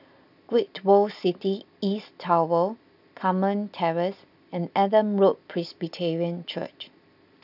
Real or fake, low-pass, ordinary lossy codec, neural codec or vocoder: real; 5.4 kHz; none; none